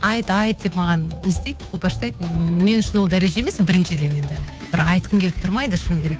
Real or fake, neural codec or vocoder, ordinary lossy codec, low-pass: fake; codec, 16 kHz, 2 kbps, FunCodec, trained on Chinese and English, 25 frames a second; none; none